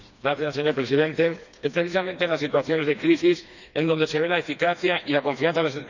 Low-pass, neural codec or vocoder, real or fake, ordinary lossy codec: 7.2 kHz; codec, 16 kHz, 2 kbps, FreqCodec, smaller model; fake; none